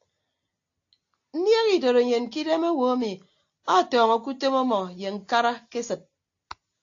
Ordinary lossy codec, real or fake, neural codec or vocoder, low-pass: AAC, 48 kbps; real; none; 7.2 kHz